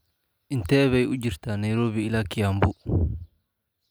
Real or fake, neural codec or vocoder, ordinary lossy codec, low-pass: real; none; none; none